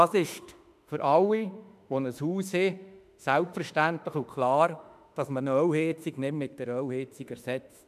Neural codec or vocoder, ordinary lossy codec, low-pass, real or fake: autoencoder, 48 kHz, 32 numbers a frame, DAC-VAE, trained on Japanese speech; none; 14.4 kHz; fake